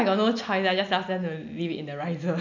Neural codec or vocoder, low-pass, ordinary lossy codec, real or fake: none; 7.2 kHz; none; real